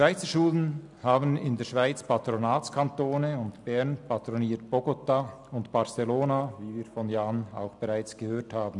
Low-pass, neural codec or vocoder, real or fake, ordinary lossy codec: 10.8 kHz; none; real; none